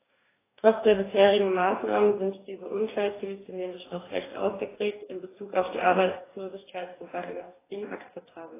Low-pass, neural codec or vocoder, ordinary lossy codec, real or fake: 3.6 kHz; codec, 44.1 kHz, 2.6 kbps, DAC; AAC, 16 kbps; fake